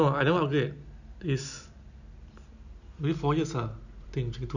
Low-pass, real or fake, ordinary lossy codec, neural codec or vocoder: 7.2 kHz; real; none; none